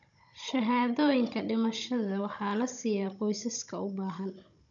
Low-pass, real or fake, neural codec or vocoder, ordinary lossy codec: 7.2 kHz; fake; codec, 16 kHz, 16 kbps, FunCodec, trained on Chinese and English, 50 frames a second; none